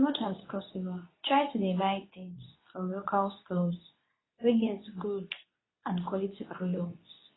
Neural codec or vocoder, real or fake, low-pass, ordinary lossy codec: codec, 24 kHz, 0.9 kbps, WavTokenizer, medium speech release version 2; fake; 7.2 kHz; AAC, 16 kbps